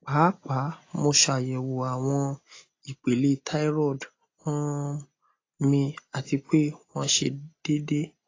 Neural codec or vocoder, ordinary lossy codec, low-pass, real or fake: none; AAC, 32 kbps; 7.2 kHz; real